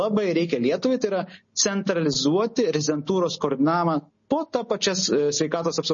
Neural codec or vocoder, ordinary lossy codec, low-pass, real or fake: none; MP3, 32 kbps; 7.2 kHz; real